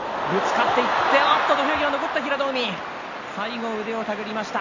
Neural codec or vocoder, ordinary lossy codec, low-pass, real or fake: none; none; 7.2 kHz; real